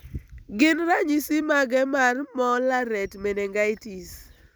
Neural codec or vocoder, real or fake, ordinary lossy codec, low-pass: none; real; none; none